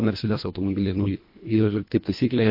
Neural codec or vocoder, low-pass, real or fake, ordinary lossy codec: codec, 24 kHz, 1.5 kbps, HILCodec; 5.4 kHz; fake; MP3, 32 kbps